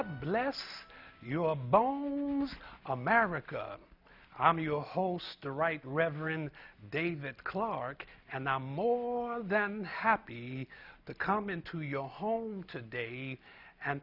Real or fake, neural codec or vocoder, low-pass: real; none; 5.4 kHz